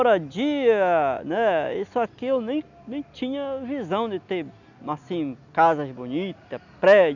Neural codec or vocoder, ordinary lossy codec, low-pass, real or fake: none; none; 7.2 kHz; real